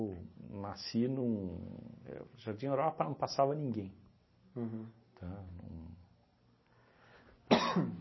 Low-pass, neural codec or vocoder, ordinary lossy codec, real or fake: 7.2 kHz; none; MP3, 24 kbps; real